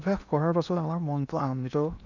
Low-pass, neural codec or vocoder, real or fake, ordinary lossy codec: 7.2 kHz; codec, 16 kHz in and 24 kHz out, 0.8 kbps, FocalCodec, streaming, 65536 codes; fake; none